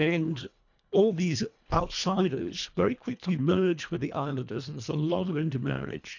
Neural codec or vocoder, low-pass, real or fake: codec, 24 kHz, 1.5 kbps, HILCodec; 7.2 kHz; fake